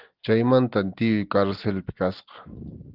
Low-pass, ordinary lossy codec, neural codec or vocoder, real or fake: 5.4 kHz; Opus, 16 kbps; none; real